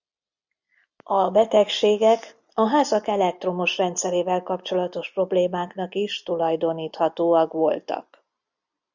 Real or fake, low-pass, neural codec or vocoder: real; 7.2 kHz; none